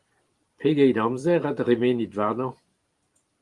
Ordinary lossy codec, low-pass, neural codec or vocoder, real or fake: Opus, 24 kbps; 10.8 kHz; vocoder, 44.1 kHz, 128 mel bands every 512 samples, BigVGAN v2; fake